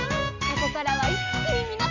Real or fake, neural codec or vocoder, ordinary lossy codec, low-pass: real; none; none; 7.2 kHz